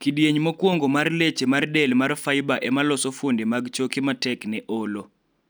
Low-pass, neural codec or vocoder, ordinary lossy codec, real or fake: none; none; none; real